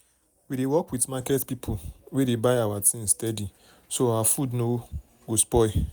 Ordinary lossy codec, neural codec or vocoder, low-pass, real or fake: none; none; none; real